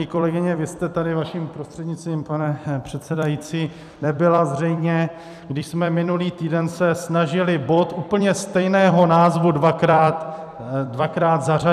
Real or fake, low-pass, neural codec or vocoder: fake; 14.4 kHz; vocoder, 44.1 kHz, 128 mel bands every 256 samples, BigVGAN v2